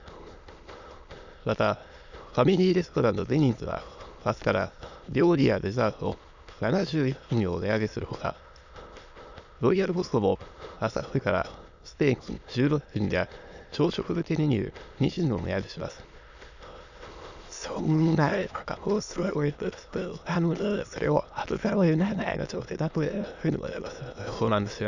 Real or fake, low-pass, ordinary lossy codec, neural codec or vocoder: fake; 7.2 kHz; none; autoencoder, 22.05 kHz, a latent of 192 numbers a frame, VITS, trained on many speakers